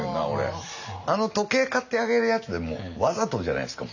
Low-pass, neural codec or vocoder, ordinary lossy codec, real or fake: 7.2 kHz; none; none; real